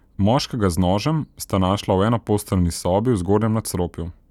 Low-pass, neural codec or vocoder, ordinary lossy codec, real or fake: 19.8 kHz; none; none; real